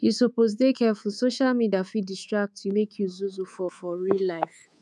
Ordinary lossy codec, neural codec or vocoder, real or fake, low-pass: none; codec, 24 kHz, 3.1 kbps, DualCodec; fake; none